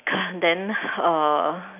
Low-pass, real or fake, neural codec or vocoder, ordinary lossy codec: 3.6 kHz; real; none; none